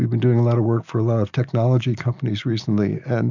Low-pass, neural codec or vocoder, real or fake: 7.2 kHz; none; real